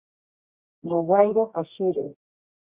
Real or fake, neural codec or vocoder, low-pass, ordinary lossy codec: fake; codec, 24 kHz, 0.9 kbps, WavTokenizer, medium music audio release; 3.6 kHz; Opus, 64 kbps